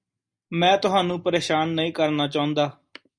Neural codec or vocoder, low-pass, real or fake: none; 9.9 kHz; real